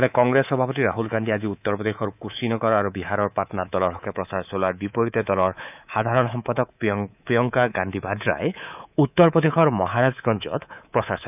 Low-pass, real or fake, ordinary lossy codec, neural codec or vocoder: 3.6 kHz; fake; none; codec, 24 kHz, 3.1 kbps, DualCodec